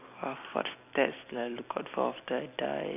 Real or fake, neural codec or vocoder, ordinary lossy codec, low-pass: real; none; none; 3.6 kHz